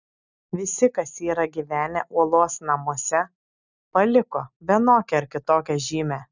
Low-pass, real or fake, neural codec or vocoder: 7.2 kHz; real; none